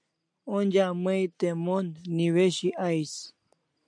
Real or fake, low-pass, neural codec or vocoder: real; 9.9 kHz; none